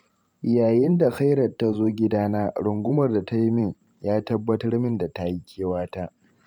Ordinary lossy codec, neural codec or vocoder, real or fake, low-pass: none; vocoder, 44.1 kHz, 128 mel bands every 512 samples, BigVGAN v2; fake; 19.8 kHz